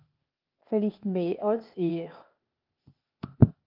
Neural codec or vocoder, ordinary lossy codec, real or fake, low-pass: codec, 16 kHz, 0.8 kbps, ZipCodec; Opus, 24 kbps; fake; 5.4 kHz